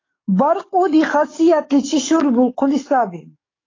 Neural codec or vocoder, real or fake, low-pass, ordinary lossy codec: codec, 44.1 kHz, 7.8 kbps, DAC; fake; 7.2 kHz; AAC, 32 kbps